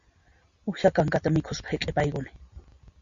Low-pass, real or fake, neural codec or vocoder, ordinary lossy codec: 7.2 kHz; real; none; Opus, 64 kbps